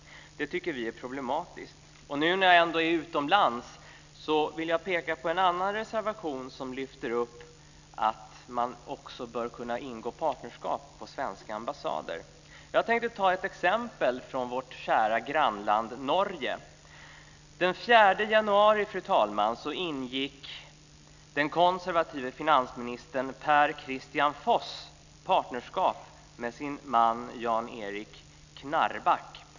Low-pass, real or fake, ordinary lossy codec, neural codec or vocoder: 7.2 kHz; real; none; none